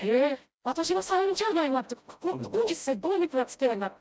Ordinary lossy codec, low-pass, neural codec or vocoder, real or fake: none; none; codec, 16 kHz, 0.5 kbps, FreqCodec, smaller model; fake